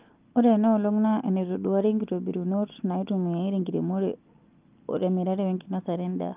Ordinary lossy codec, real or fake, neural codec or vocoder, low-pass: Opus, 32 kbps; real; none; 3.6 kHz